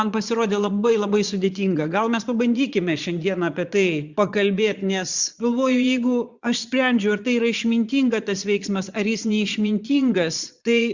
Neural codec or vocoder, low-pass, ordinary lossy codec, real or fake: vocoder, 22.05 kHz, 80 mel bands, Vocos; 7.2 kHz; Opus, 64 kbps; fake